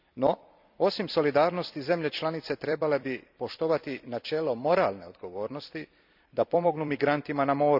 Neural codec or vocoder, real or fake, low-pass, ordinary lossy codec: vocoder, 44.1 kHz, 128 mel bands every 256 samples, BigVGAN v2; fake; 5.4 kHz; none